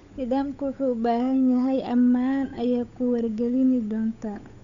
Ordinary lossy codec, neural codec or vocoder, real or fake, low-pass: none; codec, 16 kHz, 8 kbps, FunCodec, trained on Chinese and English, 25 frames a second; fake; 7.2 kHz